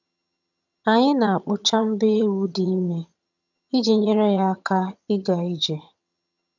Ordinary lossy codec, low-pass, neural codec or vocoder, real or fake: none; 7.2 kHz; vocoder, 22.05 kHz, 80 mel bands, HiFi-GAN; fake